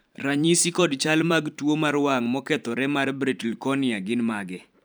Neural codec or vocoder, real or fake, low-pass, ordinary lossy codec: vocoder, 44.1 kHz, 128 mel bands every 512 samples, BigVGAN v2; fake; none; none